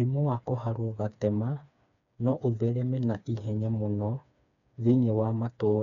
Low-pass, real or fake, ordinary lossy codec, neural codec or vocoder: 7.2 kHz; fake; none; codec, 16 kHz, 4 kbps, FreqCodec, smaller model